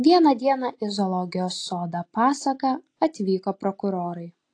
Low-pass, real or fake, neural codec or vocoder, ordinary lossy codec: 9.9 kHz; real; none; AAC, 48 kbps